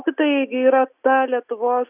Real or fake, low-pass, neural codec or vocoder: real; 3.6 kHz; none